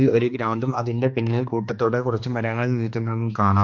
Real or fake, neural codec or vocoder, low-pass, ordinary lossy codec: fake; codec, 16 kHz, 2 kbps, X-Codec, HuBERT features, trained on general audio; 7.2 kHz; MP3, 48 kbps